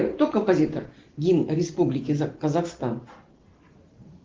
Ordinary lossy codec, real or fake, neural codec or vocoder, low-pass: Opus, 16 kbps; real; none; 7.2 kHz